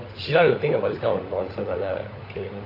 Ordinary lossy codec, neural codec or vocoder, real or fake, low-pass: AAC, 24 kbps; codec, 16 kHz, 16 kbps, FunCodec, trained on LibriTTS, 50 frames a second; fake; 5.4 kHz